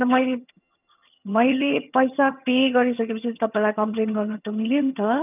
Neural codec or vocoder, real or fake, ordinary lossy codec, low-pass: vocoder, 22.05 kHz, 80 mel bands, HiFi-GAN; fake; none; 3.6 kHz